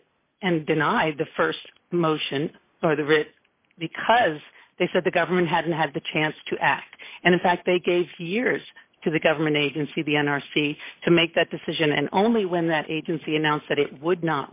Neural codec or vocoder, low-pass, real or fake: none; 3.6 kHz; real